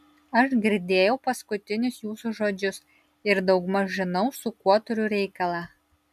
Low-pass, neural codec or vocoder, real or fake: 14.4 kHz; none; real